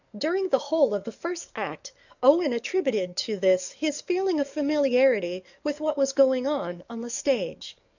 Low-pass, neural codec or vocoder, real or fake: 7.2 kHz; codec, 44.1 kHz, 7.8 kbps, DAC; fake